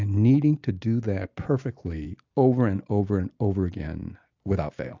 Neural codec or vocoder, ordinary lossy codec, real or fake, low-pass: none; AAC, 48 kbps; real; 7.2 kHz